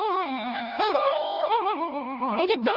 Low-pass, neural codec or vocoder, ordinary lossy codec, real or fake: 5.4 kHz; codec, 16 kHz, 1 kbps, FunCodec, trained on LibriTTS, 50 frames a second; none; fake